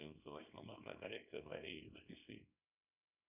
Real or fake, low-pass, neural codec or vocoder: fake; 3.6 kHz; codec, 24 kHz, 0.9 kbps, WavTokenizer, small release